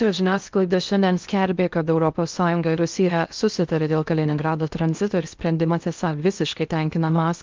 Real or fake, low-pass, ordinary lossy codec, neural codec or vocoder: fake; 7.2 kHz; Opus, 24 kbps; codec, 16 kHz in and 24 kHz out, 0.6 kbps, FocalCodec, streaming, 4096 codes